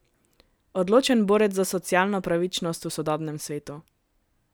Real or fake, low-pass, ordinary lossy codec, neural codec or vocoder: real; none; none; none